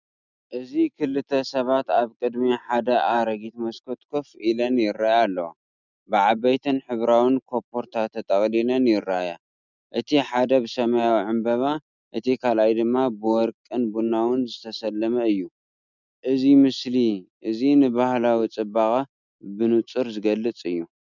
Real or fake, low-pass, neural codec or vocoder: real; 7.2 kHz; none